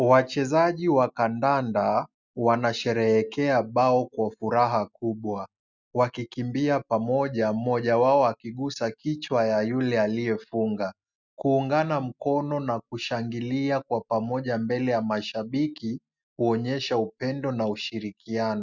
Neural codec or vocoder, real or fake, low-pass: none; real; 7.2 kHz